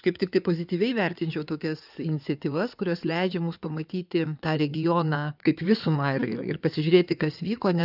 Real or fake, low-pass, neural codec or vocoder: fake; 5.4 kHz; codec, 16 kHz in and 24 kHz out, 2.2 kbps, FireRedTTS-2 codec